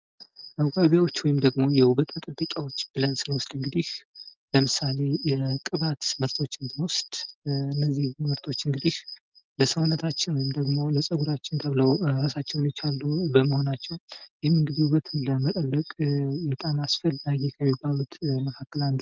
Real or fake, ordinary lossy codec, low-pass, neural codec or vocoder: real; Opus, 24 kbps; 7.2 kHz; none